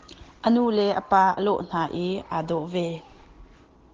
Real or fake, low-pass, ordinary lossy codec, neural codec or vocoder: real; 7.2 kHz; Opus, 16 kbps; none